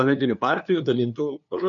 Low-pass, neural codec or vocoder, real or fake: 7.2 kHz; codec, 16 kHz, 2 kbps, FunCodec, trained on LibriTTS, 25 frames a second; fake